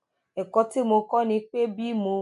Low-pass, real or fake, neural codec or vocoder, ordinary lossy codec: 10.8 kHz; real; none; none